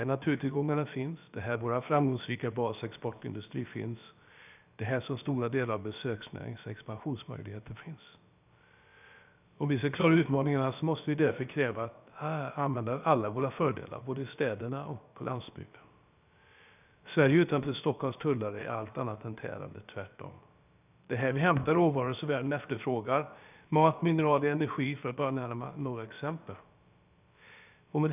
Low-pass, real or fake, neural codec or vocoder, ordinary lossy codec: 3.6 kHz; fake; codec, 16 kHz, about 1 kbps, DyCAST, with the encoder's durations; none